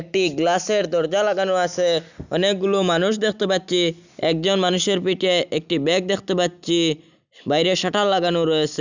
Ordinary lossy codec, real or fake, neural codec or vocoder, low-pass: none; real; none; 7.2 kHz